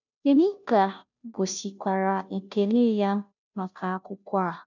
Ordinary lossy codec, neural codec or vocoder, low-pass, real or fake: none; codec, 16 kHz, 0.5 kbps, FunCodec, trained on Chinese and English, 25 frames a second; 7.2 kHz; fake